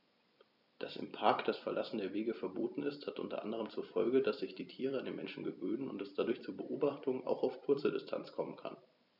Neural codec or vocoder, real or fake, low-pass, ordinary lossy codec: vocoder, 44.1 kHz, 128 mel bands every 256 samples, BigVGAN v2; fake; 5.4 kHz; none